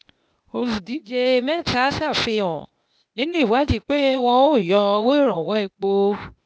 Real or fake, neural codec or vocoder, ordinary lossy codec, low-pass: fake; codec, 16 kHz, 0.8 kbps, ZipCodec; none; none